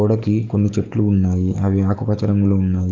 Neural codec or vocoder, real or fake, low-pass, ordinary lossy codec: codec, 44.1 kHz, 7.8 kbps, DAC; fake; 7.2 kHz; Opus, 24 kbps